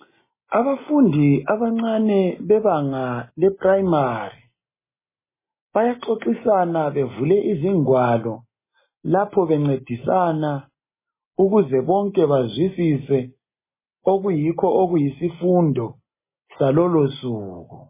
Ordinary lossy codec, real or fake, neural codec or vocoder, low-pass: MP3, 16 kbps; real; none; 3.6 kHz